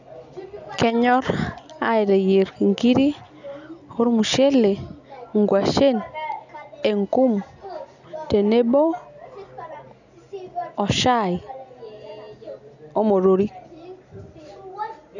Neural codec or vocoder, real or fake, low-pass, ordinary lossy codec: none; real; 7.2 kHz; none